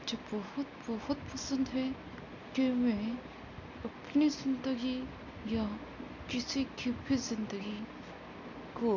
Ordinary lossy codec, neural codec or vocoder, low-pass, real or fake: none; none; 7.2 kHz; real